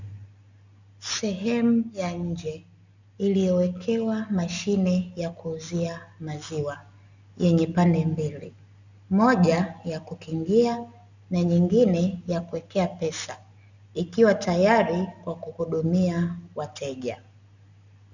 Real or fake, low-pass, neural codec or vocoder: real; 7.2 kHz; none